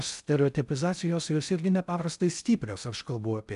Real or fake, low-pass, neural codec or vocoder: fake; 10.8 kHz; codec, 16 kHz in and 24 kHz out, 0.6 kbps, FocalCodec, streaming, 2048 codes